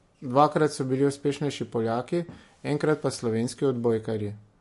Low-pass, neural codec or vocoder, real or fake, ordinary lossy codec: 14.4 kHz; none; real; MP3, 48 kbps